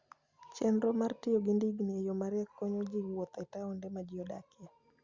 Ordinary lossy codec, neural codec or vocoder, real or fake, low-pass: Opus, 64 kbps; none; real; 7.2 kHz